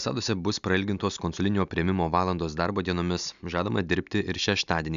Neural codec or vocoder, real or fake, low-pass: none; real; 7.2 kHz